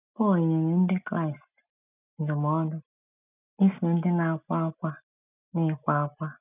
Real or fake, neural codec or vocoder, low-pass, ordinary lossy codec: real; none; 3.6 kHz; none